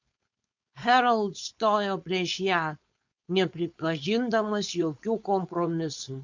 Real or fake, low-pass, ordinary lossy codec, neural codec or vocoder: fake; 7.2 kHz; MP3, 64 kbps; codec, 16 kHz, 4.8 kbps, FACodec